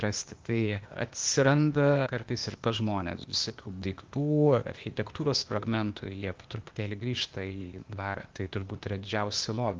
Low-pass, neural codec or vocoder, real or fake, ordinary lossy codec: 7.2 kHz; codec, 16 kHz, 0.8 kbps, ZipCodec; fake; Opus, 32 kbps